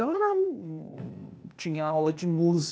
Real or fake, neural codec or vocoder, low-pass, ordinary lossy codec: fake; codec, 16 kHz, 0.8 kbps, ZipCodec; none; none